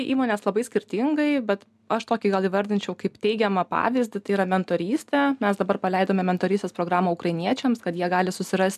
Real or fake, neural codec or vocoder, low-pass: real; none; 14.4 kHz